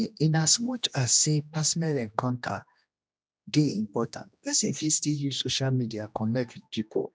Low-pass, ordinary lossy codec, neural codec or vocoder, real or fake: none; none; codec, 16 kHz, 1 kbps, X-Codec, HuBERT features, trained on general audio; fake